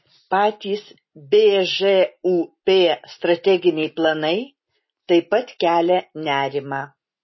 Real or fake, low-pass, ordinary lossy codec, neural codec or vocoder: real; 7.2 kHz; MP3, 24 kbps; none